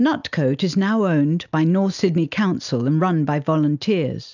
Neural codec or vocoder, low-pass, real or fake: none; 7.2 kHz; real